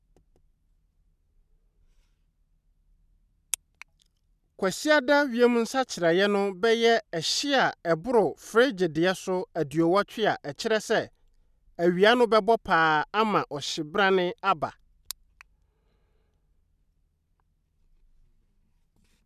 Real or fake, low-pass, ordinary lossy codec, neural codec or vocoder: real; 14.4 kHz; none; none